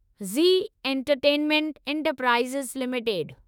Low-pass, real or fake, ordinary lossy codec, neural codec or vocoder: 19.8 kHz; fake; none; autoencoder, 48 kHz, 32 numbers a frame, DAC-VAE, trained on Japanese speech